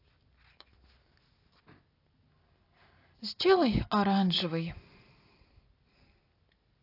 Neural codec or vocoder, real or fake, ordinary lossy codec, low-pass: none; real; AAC, 32 kbps; 5.4 kHz